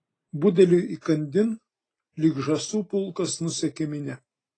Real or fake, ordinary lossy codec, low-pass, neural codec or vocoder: real; AAC, 32 kbps; 9.9 kHz; none